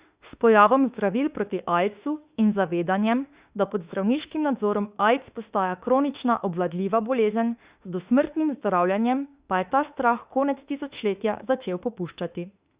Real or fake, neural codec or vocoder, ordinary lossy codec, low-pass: fake; autoencoder, 48 kHz, 32 numbers a frame, DAC-VAE, trained on Japanese speech; Opus, 64 kbps; 3.6 kHz